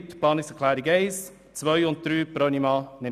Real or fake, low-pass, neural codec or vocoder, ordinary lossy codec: real; 14.4 kHz; none; none